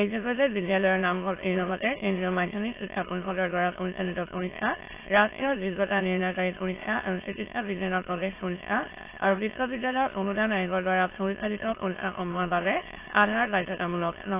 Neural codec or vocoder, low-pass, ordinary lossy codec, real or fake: autoencoder, 22.05 kHz, a latent of 192 numbers a frame, VITS, trained on many speakers; 3.6 kHz; AAC, 24 kbps; fake